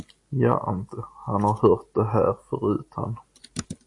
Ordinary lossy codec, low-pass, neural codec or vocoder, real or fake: AAC, 48 kbps; 10.8 kHz; none; real